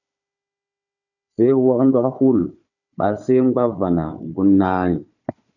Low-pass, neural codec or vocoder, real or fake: 7.2 kHz; codec, 16 kHz, 4 kbps, FunCodec, trained on Chinese and English, 50 frames a second; fake